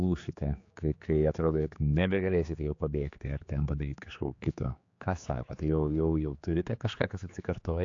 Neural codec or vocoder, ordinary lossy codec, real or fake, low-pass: codec, 16 kHz, 4 kbps, X-Codec, HuBERT features, trained on general audio; AAC, 48 kbps; fake; 7.2 kHz